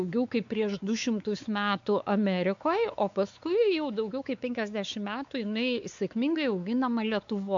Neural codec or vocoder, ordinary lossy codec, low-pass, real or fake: codec, 16 kHz, 4 kbps, X-Codec, WavLM features, trained on Multilingual LibriSpeech; AAC, 64 kbps; 7.2 kHz; fake